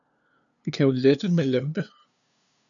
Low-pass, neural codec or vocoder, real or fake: 7.2 kHz; codec, 16 kHz, 2 kbps, FunCodec, trained on LibriTTS, 25 frames a second; fake